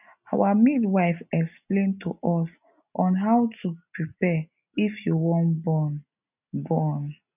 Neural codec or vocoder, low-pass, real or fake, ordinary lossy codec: none; 3.6 kHz; real; none